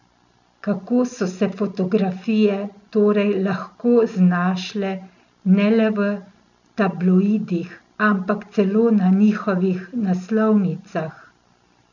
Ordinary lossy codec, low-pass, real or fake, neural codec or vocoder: none; 7.2 kHz; real; none